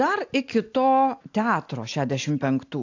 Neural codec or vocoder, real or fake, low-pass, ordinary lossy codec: none; real; 7.2 kHz; AAC, 48 kbps